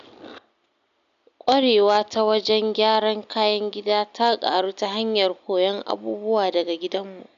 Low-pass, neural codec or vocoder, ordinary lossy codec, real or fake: 7.2 kHz; none; none; real